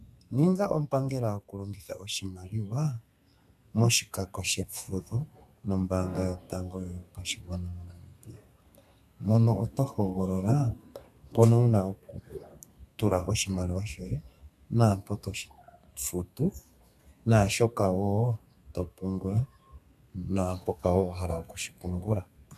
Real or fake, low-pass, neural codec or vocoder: fake; 14.4 kHz; codec, 32 kHz, 1.9 kbps, SNAC